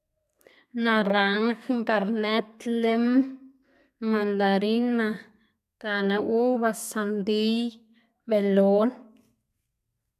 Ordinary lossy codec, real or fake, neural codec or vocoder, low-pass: none; fake; codec, 32 kHz, 1.9 kbps, SNAC; 14.4 kHz